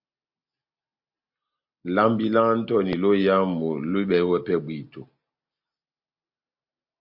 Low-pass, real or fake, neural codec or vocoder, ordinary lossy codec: 5.4 kHz; real; none; MP3, 48 kbps